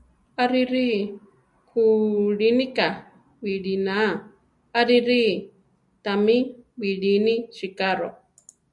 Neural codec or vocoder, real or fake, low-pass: none; real; 10.8 kHz